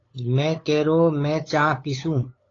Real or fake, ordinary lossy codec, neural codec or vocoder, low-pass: fake; AAC, 32 kbps; codec, 16 kHz, 8 kbps, FunCodec, trained on LibriTTS, 25 frames a second; 7.2 kHz